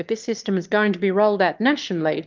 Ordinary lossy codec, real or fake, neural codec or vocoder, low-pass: Opus, 32 kbps; fake; autoencoder, 22.05 kHz, a latent of 192 numbers a frame, VITS, trained on one speaker; 7.2 kHz